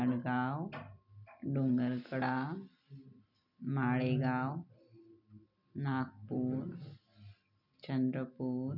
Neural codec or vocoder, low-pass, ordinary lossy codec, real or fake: none; 5.4 kHz; none; real